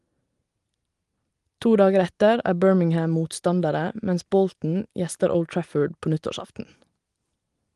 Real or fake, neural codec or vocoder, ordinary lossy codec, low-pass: real; none; Opus, 24 kbps; 10.8 kHz